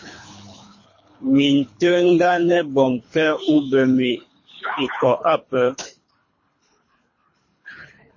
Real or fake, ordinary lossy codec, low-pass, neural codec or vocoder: fake; MP3, 32 kbps; 7.2 kHz; codec, 24 kHz, 3 kbps, HILCodec